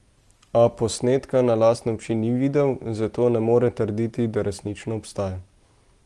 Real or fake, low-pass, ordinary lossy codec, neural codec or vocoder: real; 10.8 kHz; Opus, 24 kbps; none